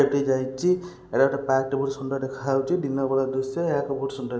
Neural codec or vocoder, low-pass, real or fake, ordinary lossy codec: none; none; real; none